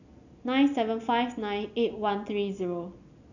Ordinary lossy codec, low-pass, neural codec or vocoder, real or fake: none; 7.2 kHz; none; real